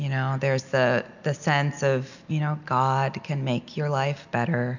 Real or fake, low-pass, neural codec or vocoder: real; 7.2 kHz; none